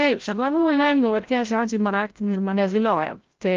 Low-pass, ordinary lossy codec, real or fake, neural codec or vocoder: 7.2 kHz; Opus, 32 kbps; fake; codec, 16 kHz, 0.5 kbps, FreqCodec, larger model